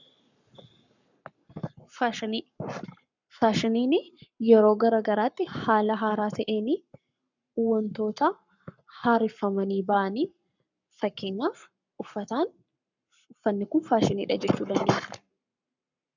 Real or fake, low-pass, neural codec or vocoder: fake; 7.2 kHz; codec, 44.1 kHz, 7.8 kbps, Pupu-Codec